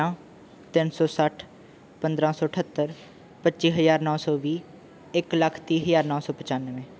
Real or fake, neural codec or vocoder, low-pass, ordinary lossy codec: real; none; none; none